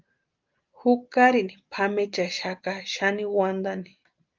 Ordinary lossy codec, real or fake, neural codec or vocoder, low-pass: Opus, 32 kbps; real; none; 7.2 kHz